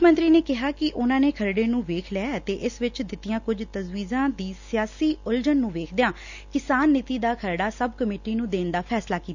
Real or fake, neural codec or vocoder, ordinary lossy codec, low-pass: real; none; none; 7.2 kHz